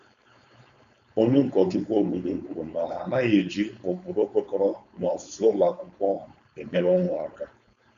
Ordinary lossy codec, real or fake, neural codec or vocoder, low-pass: AAC, 96 kbps; fake; codec, 16 kHz, 4.8 kbps, FACodec; 7.2 kHz